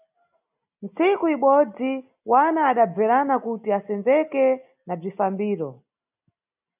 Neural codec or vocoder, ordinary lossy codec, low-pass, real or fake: none; AAC, 32 kbps; 3.6 kHz; real